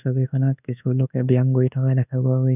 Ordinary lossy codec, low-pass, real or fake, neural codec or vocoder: none; 3.6 kHz; fake; codec, 24 kHz, 1.2 kbps, DualCodec